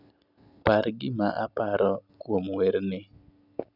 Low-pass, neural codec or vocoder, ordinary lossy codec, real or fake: 5.4 kHz; none; none; real